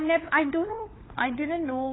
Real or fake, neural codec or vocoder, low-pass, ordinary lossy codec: fake; codec, 16 kHz, 4 kbps, FunCodec, trained on LibriTTS, 50 frames a second; 7.2 kHz; AAC, 16 kbps